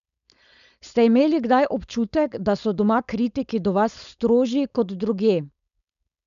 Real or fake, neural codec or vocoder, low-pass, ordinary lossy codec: fake; codec, 16 kHz, 4.8 kbps, FACodec; 7.2 kHz; none